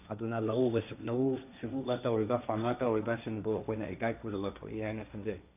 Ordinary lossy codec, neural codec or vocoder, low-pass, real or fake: none; codec, 16 kHz, 1.1 kbps, Voila-Tokenizer; 3.6 kHz; fake